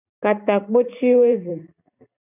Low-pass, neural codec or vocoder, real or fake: 3.6 kHz; vocoder, 44.1 kHz, 128 mel bands every 512 samples, BigVGAN v2; fake